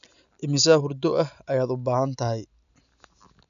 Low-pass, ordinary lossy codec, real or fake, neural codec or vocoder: 7.2 kHz; none; real; none